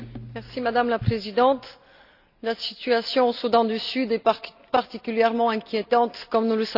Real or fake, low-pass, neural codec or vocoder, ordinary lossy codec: real; 5.4 kHz; none; none